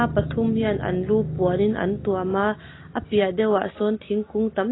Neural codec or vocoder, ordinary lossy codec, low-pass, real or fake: none; AAC, 16 kbps; 7.2 kHz; real